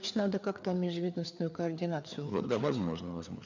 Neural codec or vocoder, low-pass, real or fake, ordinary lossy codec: codec, 16 kHz, 4 kbps, FreqCodec, larger model; 7.2 kHz; fake; none